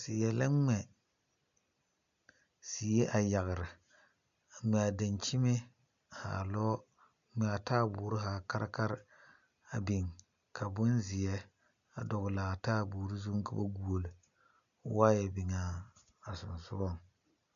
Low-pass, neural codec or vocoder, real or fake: 7.2 kHz; none; real